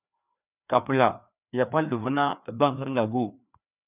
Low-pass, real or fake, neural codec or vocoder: 3.6 kHz; fake; codec, 16 kHz, 2 kbps, FreqCodec, larger model